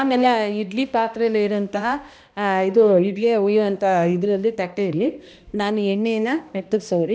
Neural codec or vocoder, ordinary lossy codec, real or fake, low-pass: codec, 16 kHz, 1 kbps, X-Codec, HuBERT features, trained on balanced general audio; none; fake; none